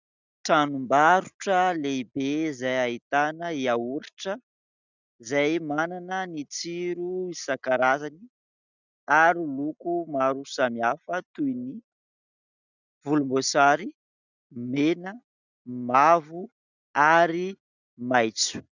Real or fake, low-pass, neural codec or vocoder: real; 7.2 kHz; none